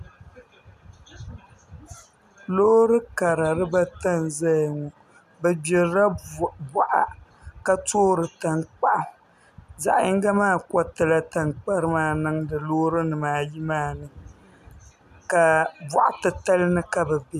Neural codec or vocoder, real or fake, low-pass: none; real; 14.4 kHz